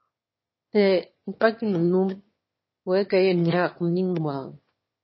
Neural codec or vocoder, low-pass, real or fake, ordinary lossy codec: autoencoder, 22.05 kHz, a latent of 192 numbers a frame, VITS, trained on one speaker; 7.2 kHz; fake; MP3, 24 kbps